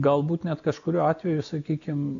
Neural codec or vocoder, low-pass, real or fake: none; 7.2 kHz; real